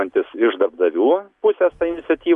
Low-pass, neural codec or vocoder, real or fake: 10.8 kHz; none; real